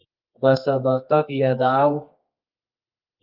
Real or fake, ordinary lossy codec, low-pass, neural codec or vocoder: fake; Opus, 24 kbps; 5.4 kHz; codec, 24 kHz, 0.9 kbps, WavTokenizer, medium music audio release